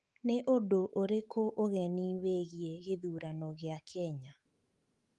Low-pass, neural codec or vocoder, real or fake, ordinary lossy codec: 10.8 kHz; autoencoder, 48 kHz, 128 numbers a frame, DAC-VAE, trained on Japanese speech; fake; Opus, 24 kbps